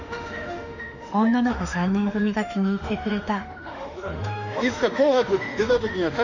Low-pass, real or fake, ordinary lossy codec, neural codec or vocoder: 7.2 kHz; fake; none; autoencoder, 48 kHz, 32 numbers a frame, DAC-VAE, trained on Japanese speech